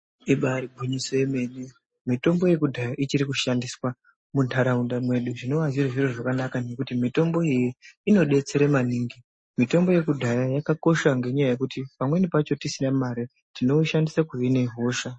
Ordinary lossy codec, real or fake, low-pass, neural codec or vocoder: MP3, 32 kbps; real; 9.9 kHz; none